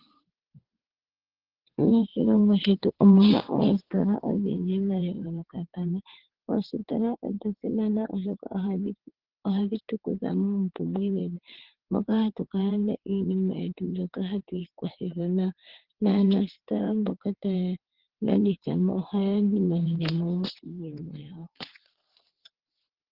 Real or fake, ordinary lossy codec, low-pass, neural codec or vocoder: fake; Opus, 16 kbps; 5.4 kHz; vocoder, 22.05 kHz, 80 mel bands, WaveNeXt